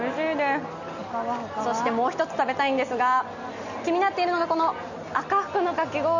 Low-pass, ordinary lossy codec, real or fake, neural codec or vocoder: 7.2 kHz; none; real; none